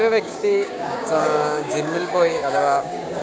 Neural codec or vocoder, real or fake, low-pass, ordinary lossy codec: codec, 16 kHz, 6 kbps, DAC; fake; none; none